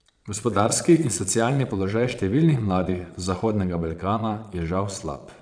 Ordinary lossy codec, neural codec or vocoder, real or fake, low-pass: none; vocoder, 22.05 kHz, 80 mel bands, Vocos; fake; 9.9 kHz